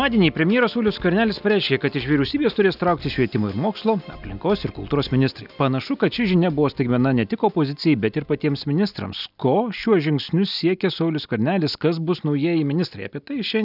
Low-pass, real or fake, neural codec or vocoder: 5.4 kHz; real; none